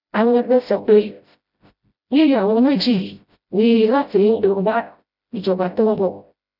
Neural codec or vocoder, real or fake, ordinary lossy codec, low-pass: codec, 16 kHz, 0.5 kbps, FreqCodec, smaller model; fake; none; 5.4 kHz